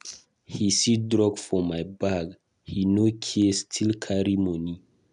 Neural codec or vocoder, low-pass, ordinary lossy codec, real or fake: none; 10.8 kHz; none; real